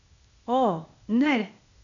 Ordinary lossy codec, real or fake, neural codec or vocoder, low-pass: none; fake; codec, 16 kHz, 0.8 kbps, ZipCodec; 7.2 kHz